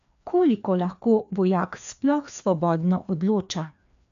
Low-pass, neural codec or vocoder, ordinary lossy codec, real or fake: 7.2 kHz; codec, 16 kHz, 2 kbps, FreqCodec, larger model; none; fake